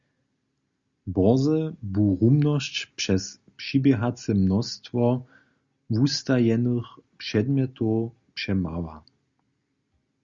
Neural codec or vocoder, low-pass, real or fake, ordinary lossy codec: none; 7.2 kHz; real; Opus, 64 kbps